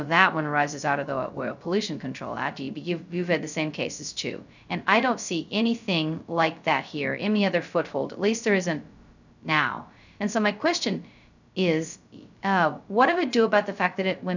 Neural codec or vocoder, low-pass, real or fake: codec, 16 kHz, 0.2 kbps, FocalCodec; 7.2 kHz; fake